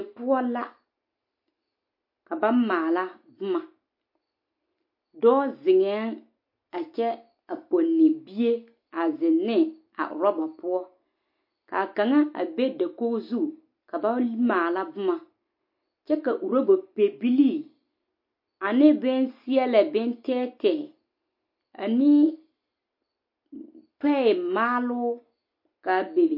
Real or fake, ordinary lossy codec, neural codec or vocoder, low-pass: real; MP3, 32 kbps; none; 5.4 kHz